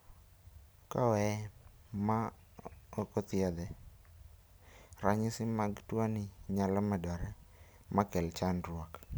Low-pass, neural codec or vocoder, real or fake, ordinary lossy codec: none; none; real; none